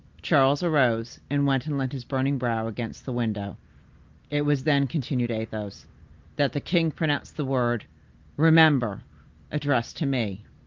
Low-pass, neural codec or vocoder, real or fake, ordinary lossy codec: 7.2 kHz; none; real; Opus, 32 kbps